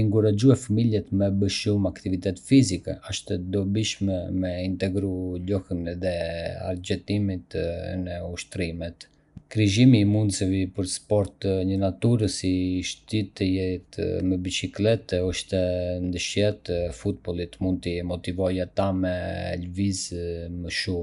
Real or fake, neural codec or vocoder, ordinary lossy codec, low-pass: real; none; none; 10.8 kHz